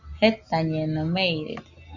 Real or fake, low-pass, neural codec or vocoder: real; 7.2 kHz; none